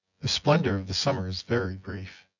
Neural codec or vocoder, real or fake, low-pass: vocoder, 24 kHz, 100 mel bands, Vocos; fake; 7.2 kHz